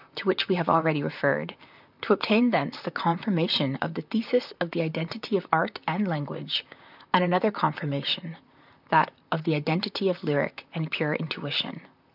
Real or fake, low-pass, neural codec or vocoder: fake; 5.4 kHz; vocoder, 44.1 kHz, 128 mel bands, Pupu-Vocoder